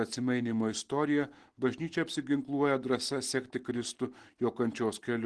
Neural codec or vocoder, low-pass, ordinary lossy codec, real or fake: none; 10.8 kHz; Opus, 16 kbps; real